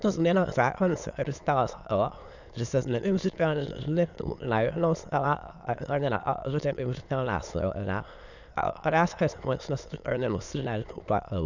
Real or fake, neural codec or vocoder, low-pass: fake; autoencoder, 22.05 kHz, a latent of 192 numbers a frame, VITS, trained on many speakers; 7.2 kHz